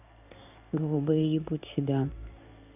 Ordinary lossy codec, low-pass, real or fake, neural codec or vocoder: none; 3.6 kHz; fake; codec, 16 kHz in and 24 kHz out, 1 kbps, XY-Tokenizer